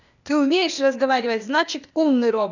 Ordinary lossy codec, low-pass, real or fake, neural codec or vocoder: none; 7.2 kHz; fake; codec, 16 kHz, 0.8 kbps, ZipCodec